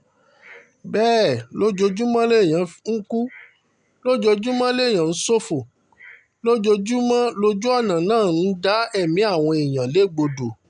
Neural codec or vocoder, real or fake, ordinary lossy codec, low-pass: none; real; none; 9.9 kHz